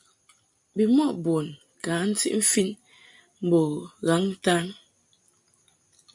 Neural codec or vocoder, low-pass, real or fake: none; 10.8 kHz; real